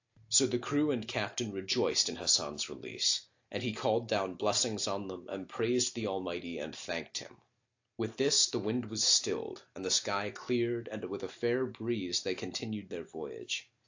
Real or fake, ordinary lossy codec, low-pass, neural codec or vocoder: real; AAC, 48 kbps; 7.2 kHz; none